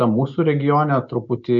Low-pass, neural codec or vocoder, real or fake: 7.2 kHz; none; real